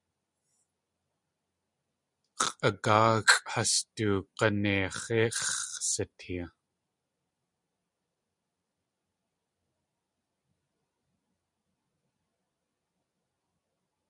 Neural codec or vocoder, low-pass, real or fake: none; 10.8 kHz; real